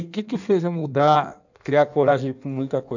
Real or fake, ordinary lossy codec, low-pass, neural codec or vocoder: fake; none; 7.2 kHz; codec, 16 kHz in and 24 kHz out, 1.1 kbps, FireRedTTS-2 codec